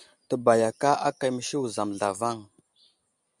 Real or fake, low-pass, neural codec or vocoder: real; 10.8 kHz; none